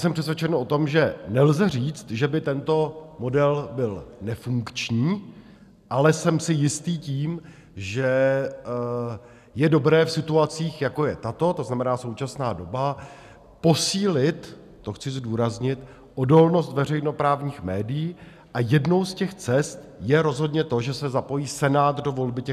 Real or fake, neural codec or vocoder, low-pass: real; none; 14.4 kHz